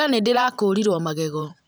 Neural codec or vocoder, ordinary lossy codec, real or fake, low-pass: vocoder, 44.1 kHz, 128 mel bands every 512 samples, BigVGAN v2; none; fake; none